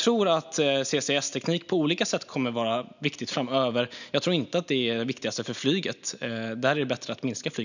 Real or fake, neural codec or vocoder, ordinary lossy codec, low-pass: real; none; none; 7.2 kHz